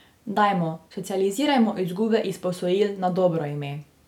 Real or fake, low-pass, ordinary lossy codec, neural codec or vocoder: real; 19.8 kHz; none; none